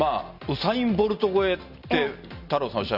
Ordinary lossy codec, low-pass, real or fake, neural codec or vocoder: none; 5.4 kHz; real; none